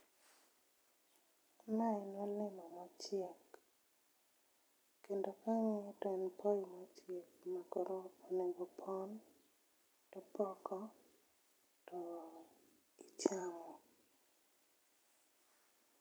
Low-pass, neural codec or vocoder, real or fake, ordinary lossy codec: none; vocoder, 44.1 kHz, 128 mel bands every 256 samples, BigVGAN v2; fake; none